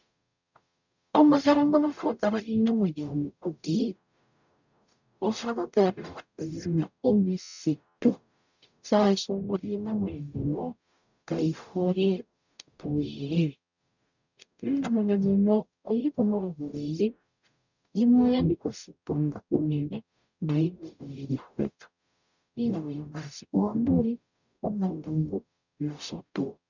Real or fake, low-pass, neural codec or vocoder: fake; 7.2 kHz; codec, 44.1 kHz, 0.9 kbps, DAC